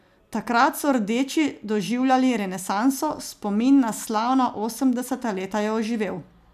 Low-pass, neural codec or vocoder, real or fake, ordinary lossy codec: 14.4 kHz; none; real; none